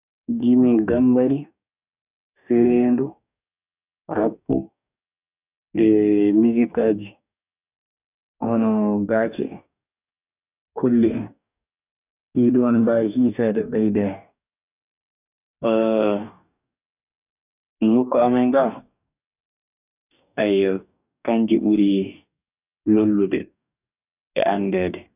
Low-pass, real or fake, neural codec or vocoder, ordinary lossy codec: 3.6 kHz; fake; codec, 44.1 kHz, 2.6 kbps, DAC; none